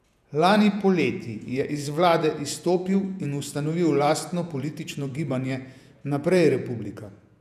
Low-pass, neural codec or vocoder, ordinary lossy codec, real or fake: 14.4 kHz; vocoder, 48 kHz, 128 mel bands, Vocos; none; fake